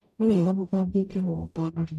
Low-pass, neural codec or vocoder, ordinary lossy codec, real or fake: 14.4 kHz; codec, 44.1 kHz, 0.9 kbps, DAC; none; fake